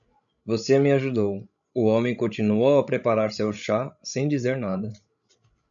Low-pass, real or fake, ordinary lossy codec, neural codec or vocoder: 7.2 kHz; fake; MP3, 96 kbps; codec, 16 kHz, 16 kbps, FreqCodec, larger model